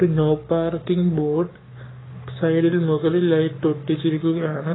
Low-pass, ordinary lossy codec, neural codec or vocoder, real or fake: 7.2 kHz; AAC, 16 kbps; codec, 44.1 kHz, 7.8 kbps, Pupu-Codec; fake